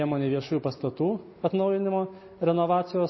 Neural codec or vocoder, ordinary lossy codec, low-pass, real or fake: none; MP3, 24 kbps; 7.2 kHz; real